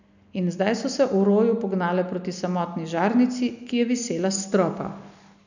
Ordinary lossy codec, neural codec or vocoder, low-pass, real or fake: none; none; 7.2 kHz; real